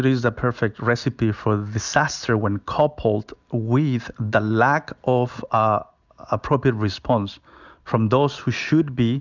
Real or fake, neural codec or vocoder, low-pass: real; none; 7.2 kHz